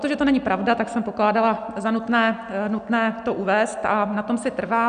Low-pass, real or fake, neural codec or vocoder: 9.9 kHz; real; none